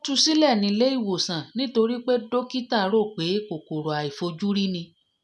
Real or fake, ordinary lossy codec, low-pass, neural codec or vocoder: real; none; none; none